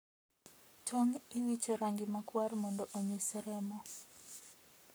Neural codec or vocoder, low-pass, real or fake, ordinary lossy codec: codec, 44.1 kHz, 7.8 kbps, Pupu-Codec; none; fake; none